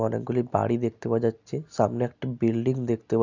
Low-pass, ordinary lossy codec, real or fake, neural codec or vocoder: 7.2 kHz; none; real; none